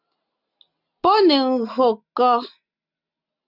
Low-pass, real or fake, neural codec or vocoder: 5.4 kHz; real; none